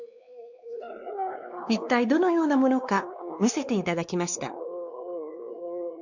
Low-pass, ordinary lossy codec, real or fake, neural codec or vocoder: 7.2 kHz; none; fake; codec, 16 kHz, 2 kbps, X-Codec, WavLM features, trained on Multilingual LibriSpeech